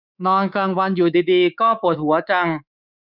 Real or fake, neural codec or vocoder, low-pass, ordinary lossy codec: fake; codec, 24 kHz, 3.1 kbps, DualCodec; 5.4 kHz; none